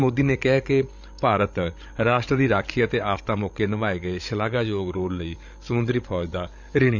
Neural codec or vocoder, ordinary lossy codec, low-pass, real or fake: codec, 16 kHz, 16 kbps, FreqCodec, larger model; none; 7.2 kHz; fake